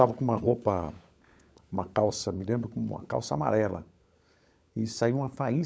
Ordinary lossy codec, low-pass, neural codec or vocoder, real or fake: none; none; codec, 16 kHz, 16 kbps, FunCodec, trained on LibriTTS, 50 frames a second; fake